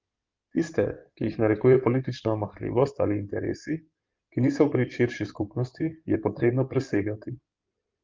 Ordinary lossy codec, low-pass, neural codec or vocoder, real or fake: Opus, 24 kbps; 7.2 kHz; codec, 16 kHz in and 24 kHz out, 2.2 kbps, FireRedTTS-2 codec; fake